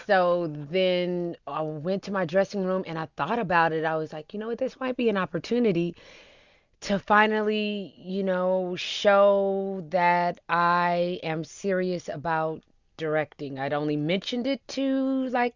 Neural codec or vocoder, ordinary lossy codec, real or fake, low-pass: none; Opus, 64 kbps; real; 7.2 kHz